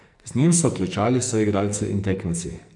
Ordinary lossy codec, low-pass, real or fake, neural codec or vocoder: none; 10.8 kHz; fake; codec, 44.1 kHz, 2.6 kbps, SNAC